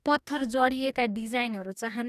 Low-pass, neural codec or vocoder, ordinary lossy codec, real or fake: 14.4 kHz; codec, 44.1 kHz, 2.6 kbps, DAC; none; fake